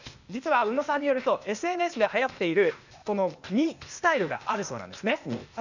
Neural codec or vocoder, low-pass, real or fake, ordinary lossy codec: codec, 16 kHz, 0.8 kbps, ZipCodec; 7.2 kHz; fake; none